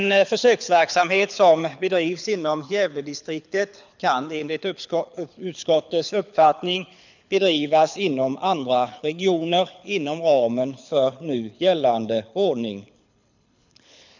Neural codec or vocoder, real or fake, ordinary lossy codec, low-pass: codec, 24 kHz, 6 kbps, HILCodec; fake; none; 7.2 kHz